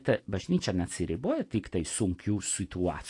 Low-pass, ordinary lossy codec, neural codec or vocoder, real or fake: 10.8 kHz; AAC, 48 kbps; none; real